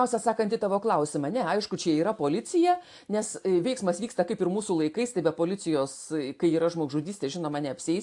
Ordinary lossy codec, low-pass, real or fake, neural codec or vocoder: AAC, 64 kbps; 10.8 kHz; real; none